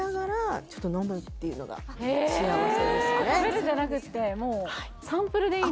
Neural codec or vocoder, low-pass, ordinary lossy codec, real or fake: none; none; none; real